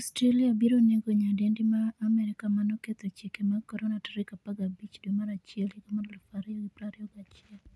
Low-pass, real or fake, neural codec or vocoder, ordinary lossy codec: none; real; none; none